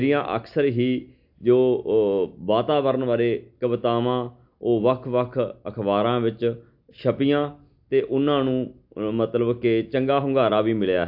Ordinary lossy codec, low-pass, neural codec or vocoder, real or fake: AAC, 48 kbps; 5.4 kHz; none; real